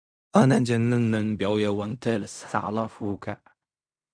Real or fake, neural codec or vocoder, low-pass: fake; codec, 16 kHz in and 24 kHz out, 0.4 kbps, LongCat-Audio-Codec, fine tuned four codebook decoder; 9.9 kHz